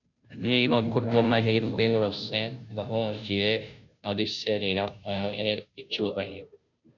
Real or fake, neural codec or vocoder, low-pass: fake; codec, 16 kHz, 0.5 kbps, FunCodec, trained on Chinese and English, 25 frames a second; 7.2 kHz